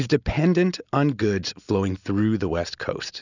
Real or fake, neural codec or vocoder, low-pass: real; none; 7.2 kHz